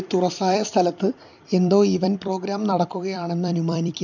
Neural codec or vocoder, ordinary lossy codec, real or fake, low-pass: vocoder, 44.1 kHz, 128 mel bands every 256 samples, BigVGAN v2; none; fake; 7.2 kHz